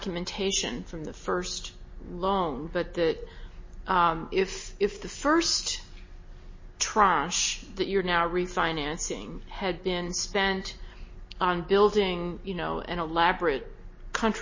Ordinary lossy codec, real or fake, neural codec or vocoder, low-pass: MP3, 32 kbps; real; none; 7.2 kHz